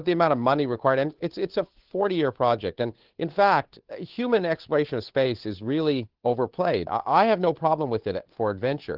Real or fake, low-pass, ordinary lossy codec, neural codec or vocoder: fake; 5.4 kHz; Opus, 16 kbps; codec, 16 kHz, 8 kbps, FunCodec, trained on Chinese and English, 25 frames a second